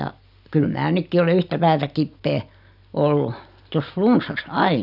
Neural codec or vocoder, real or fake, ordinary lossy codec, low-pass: none; real; none; 5.4 kHz